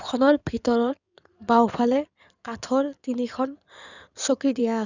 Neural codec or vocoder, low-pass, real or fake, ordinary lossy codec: codec, 16 kHz in and 24 kHz out, 2.2 kbps, FireRedTTS-2 codec; 7.2 kHz; fake; none